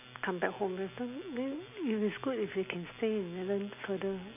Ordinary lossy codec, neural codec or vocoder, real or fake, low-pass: none; none; real; 3.6 kHz